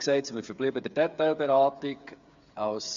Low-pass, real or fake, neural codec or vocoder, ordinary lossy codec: 7.2 kHz; fake; codec, 16 kHz, 8 kbps, FreqCodec, smaller model; MP3, 64 kbps